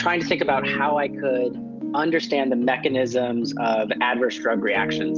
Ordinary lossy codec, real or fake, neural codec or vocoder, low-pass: Opus, 32 kbps; real; none; 7.2 kHz